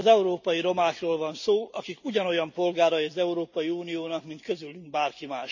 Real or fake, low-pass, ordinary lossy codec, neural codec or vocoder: real; 7.2 kHz; none; none